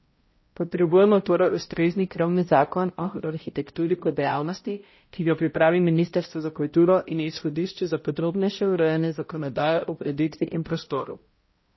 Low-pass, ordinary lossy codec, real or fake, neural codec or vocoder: 7.2 kHz; MP3, 24 kbps; fake; codec, 16 kHz, 0.5 kbps, X-Codec, HuBERT features, trained on balanced general audio